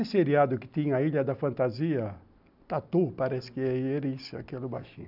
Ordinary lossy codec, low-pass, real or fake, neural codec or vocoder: none; 5.4 kHz; real; none